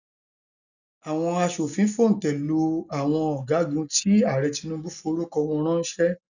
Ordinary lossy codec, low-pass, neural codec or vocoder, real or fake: none; 7.2 kHz; none; real